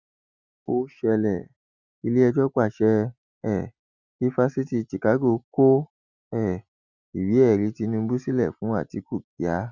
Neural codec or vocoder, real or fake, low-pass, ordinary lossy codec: none; real; 7.2 kHz; Opus, 64 kbps